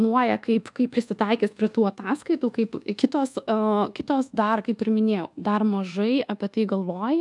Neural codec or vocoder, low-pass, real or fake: codec, 24 kHz, 1.2 kbps, DualCodec; 10.8 kHz; fake